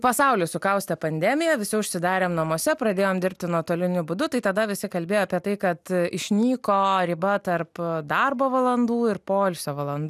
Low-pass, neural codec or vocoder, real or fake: 14.4 kHz; none; real